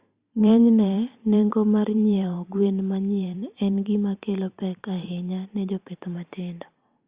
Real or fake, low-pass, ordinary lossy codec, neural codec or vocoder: fake; 3.6 kHz; Opus, 64 kbps; vocoder, 44.1 kHz, 128 mel bands every 512 samples, BigVGAN v2